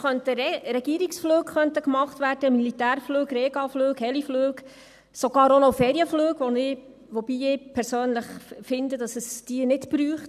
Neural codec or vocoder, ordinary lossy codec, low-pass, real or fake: none; none; 14.4 kHz; real